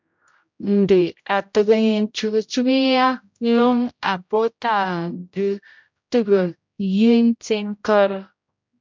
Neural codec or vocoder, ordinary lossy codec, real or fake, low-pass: codec, 16 kHz, 0.5 kbps, X-Codec, HuBERT features, trained on general audio; MP3, 64 kbps; fake; 7.2 kHz